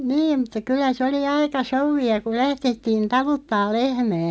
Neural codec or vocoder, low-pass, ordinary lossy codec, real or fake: none; none; none; real